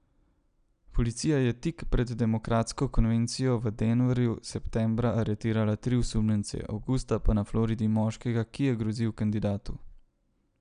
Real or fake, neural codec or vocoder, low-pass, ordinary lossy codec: real; none; 9.9 kHz; none